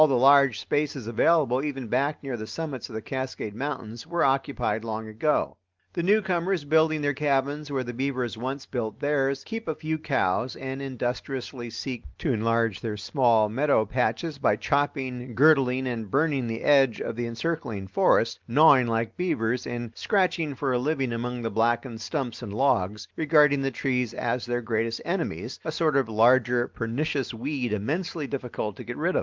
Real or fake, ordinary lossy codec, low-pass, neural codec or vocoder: real; Opus, 32 kbps; 7.2 kHz; none